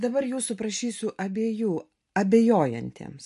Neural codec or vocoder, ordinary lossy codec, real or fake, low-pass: none; MP3, 48 kbps; real; 14.4 kHz